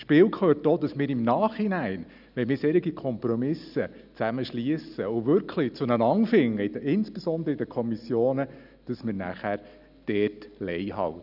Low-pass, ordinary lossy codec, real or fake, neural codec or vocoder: 5.4 kHz; none; real; none